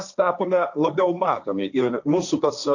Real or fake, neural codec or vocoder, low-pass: fake; codec, 16 kHz, 1.1 kbps, Voila-Tokenizer; 7.2 kHz